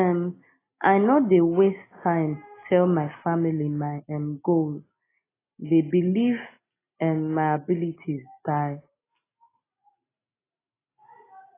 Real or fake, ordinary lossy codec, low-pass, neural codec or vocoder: real; AAC, 16 kbps; 3.6 kHz; none